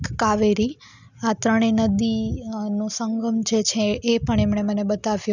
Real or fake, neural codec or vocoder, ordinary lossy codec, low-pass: real; none; none; 7.2 kHz